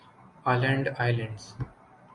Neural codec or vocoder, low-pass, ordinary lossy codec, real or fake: none; 10.8 kHz; Opus, 64 kbps; real